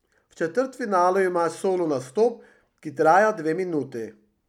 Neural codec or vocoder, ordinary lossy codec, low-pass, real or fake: none; none; 19.8 kHz; real